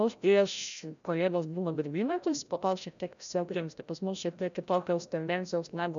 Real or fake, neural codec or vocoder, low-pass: fake; codec, 16 kHz, 0.5 kbps, FreqCodec, larger model; 7.2 kHz